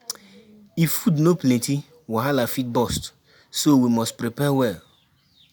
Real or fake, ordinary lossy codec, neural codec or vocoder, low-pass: real; none; none; none